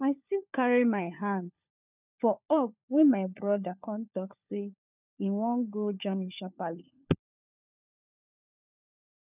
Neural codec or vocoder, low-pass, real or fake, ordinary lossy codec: codec, 16 kHz, 4 kbps, FunCodec, trained on LibriTTS, 50 frames a second; 3.6 kHz; fake; none